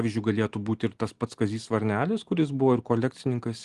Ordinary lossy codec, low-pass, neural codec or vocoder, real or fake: Opus, 24 kbps; 10.8 kHz; none; real